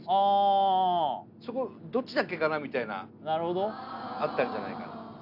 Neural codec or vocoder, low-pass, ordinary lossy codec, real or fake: none; 5.4 kHz; none; real